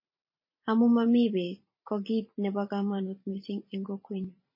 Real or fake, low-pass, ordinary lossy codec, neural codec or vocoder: fake; 5.4 kHz; MP3, 24 kbps; vocoder, 44.1 kHz, 128 mel bands every 512 samples, BigVGAN v2